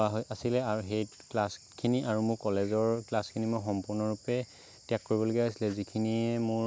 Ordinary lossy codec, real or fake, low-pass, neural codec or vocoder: none; real; none; none